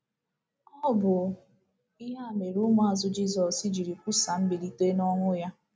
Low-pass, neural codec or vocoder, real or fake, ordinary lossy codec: none; none; real; none